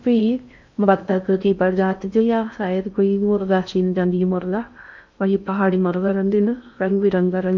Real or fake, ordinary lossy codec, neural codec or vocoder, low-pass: fake; MP3, 64 kbps; codec, 16 kHz in and 24 kHz out, 0.6 kbps, FocalCodec, streaming, 4096 codes; 7.2 kHz